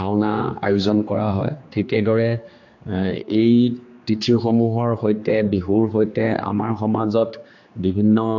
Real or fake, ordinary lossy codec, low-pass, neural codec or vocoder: fake; AAC, 48 kbps; 7.2 kHz; codec, 16 kHz, 2 kbps, X-Codec, HuBERT features, trained on general audio